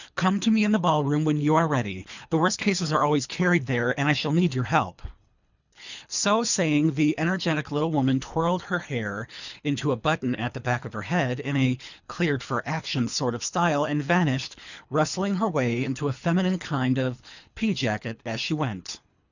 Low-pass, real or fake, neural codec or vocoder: 7.2 kHz; fake; codec, 24 kHz, 3 kbps, HILCodec